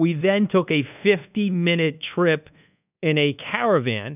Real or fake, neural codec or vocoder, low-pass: fake; codec, 24 kHz, 1.2 kbps, DualCodec; 3.6 kHz